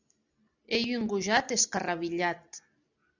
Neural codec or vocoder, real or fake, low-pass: none; real; 7.2 kHz